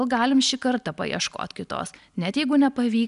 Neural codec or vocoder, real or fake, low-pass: none; real; 10.8 kHz